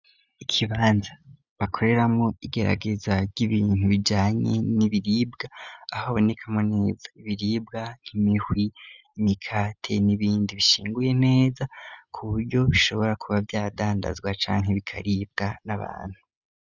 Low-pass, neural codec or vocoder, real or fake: 7.2 kHz; none; real